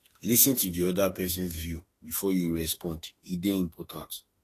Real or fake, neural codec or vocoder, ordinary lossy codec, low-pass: fake; autoencoder, 48 kHz, 32 numbers a frame, DAC-VAE, trained on Japanese speech; AAC, 48 kbps; 14.4 kHz